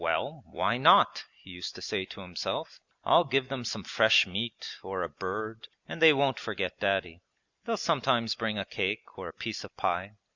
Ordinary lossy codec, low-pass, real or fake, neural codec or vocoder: Opus, 64 kbps; 7.2 kHz; real; none